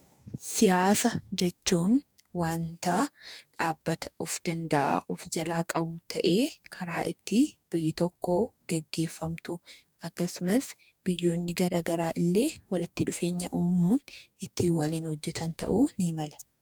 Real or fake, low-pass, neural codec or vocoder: fake; 19.8 kHz; codec, 44.1 kHz, 2.6 kbps, DAC